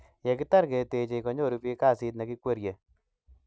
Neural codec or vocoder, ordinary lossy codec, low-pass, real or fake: none; none; none; real